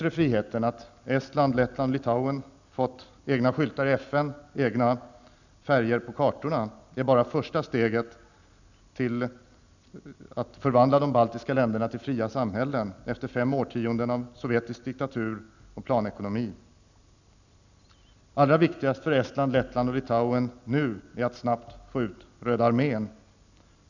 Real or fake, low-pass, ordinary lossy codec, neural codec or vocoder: real; 7.2 kHz; none; none